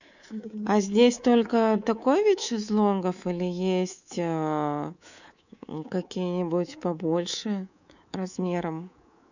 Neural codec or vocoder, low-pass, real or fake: codec, 24 kHz, 3.1 kbps, DualCodec; 7.2 kHz; fake